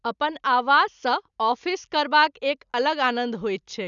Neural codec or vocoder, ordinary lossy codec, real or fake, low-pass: none; none; real; 7.2 kHz